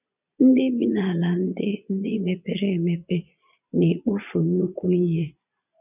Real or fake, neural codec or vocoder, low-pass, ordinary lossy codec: fake; vocoder, 22.05 kHz, 80 mel bands, Vocos; 3.6 kHz; none